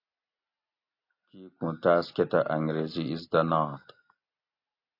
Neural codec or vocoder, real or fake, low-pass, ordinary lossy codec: none; real; 5.4 kHz; AAC, 32 kbps